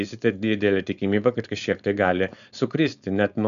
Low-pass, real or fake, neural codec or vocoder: 7.2 kHz; fake; codec, 16 kHz, 4.8 kbps, FACodec